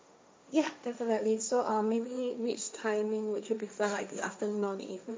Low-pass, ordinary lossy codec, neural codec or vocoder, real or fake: none; none; codec, 16 kHz, 1.1 kbps, Voila-Tokenizer; fake